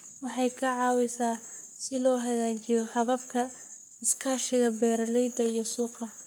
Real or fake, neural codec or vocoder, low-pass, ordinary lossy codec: fake; codec, 44.1 kHz, 3.4 kbps, Pupu-Codec; none; none